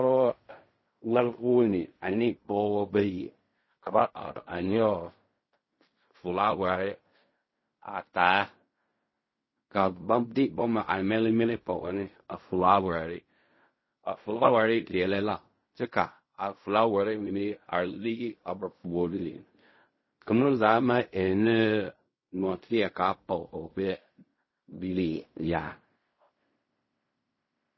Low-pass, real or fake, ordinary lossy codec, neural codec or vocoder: 7.2 kHz; fake; MP3, 24 kbps; codec, 16 kHz in and 24 kHz out, 0.4 kbps, LongCat-Audio-Codec, fine tuned four codebook decoder